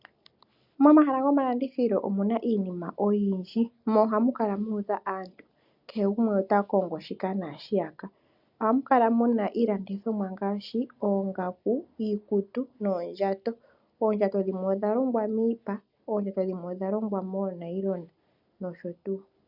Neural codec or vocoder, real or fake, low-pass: none; real; 5.4 kHz